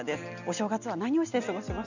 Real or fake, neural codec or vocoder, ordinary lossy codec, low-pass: real; none; none; 7.2 kHz